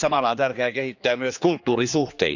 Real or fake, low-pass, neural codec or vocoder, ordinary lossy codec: fake; 7.2 kHz; codec, 16 kHz, 2 kbps, X-Codec, HuBERT features, trained on general audio; none